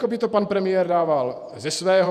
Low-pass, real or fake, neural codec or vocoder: 14.4 kHz; fake; vocoder, 48 kHz, 128 mel bands, Vocos